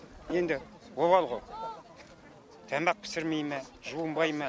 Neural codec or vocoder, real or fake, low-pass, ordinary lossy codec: none; real; none; none